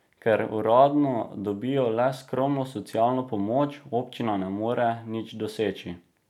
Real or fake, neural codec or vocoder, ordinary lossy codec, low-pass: real; none; none; 19.8 kHz